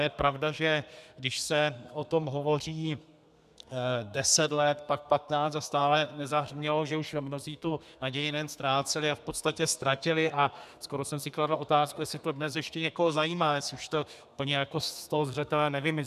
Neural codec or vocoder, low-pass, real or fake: codec, 32 kHz, 1.9 kbps, SNAC; 14.4 kHz; fake